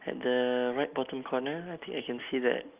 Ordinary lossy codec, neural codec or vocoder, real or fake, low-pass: Opus, 24 kbps; none; real; 3.6 kHz